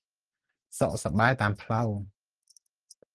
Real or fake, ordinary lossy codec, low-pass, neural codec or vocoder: real; Opus, 16 kbps; 10.8 kHz; none